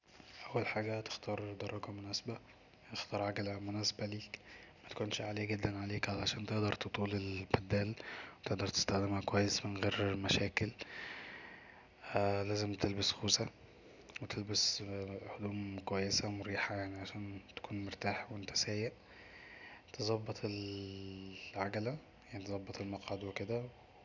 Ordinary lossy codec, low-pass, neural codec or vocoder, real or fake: none; 7.2 kHz; none; real